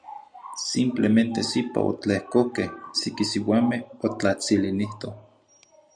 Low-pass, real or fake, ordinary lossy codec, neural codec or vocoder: 9.9 kHz; real; Opus, 64 kbps; none